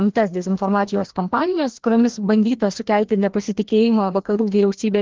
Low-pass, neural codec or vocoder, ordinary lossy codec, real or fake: 7.2 kHz; codec, 16 kHz, 1 kbps, FreqCodec, larger model; Opus, 16 kbps; fake